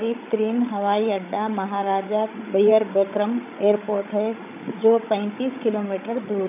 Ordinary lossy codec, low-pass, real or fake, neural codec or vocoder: none; 3.6 kHz; fake; codec, 16 kHz, 16 kbps, FreqCodec, larger model